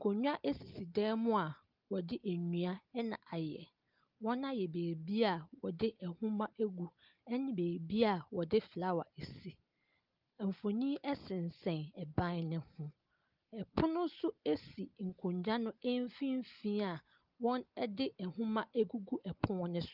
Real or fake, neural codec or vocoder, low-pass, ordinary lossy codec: real; none; 5.4 kHz; Opus, 32 kbps